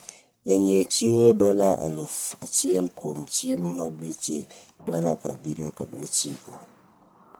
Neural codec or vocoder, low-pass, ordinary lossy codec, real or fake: codec, 44.1 kHz, 1.7 kbps, Pupu-Codec; none; none; fake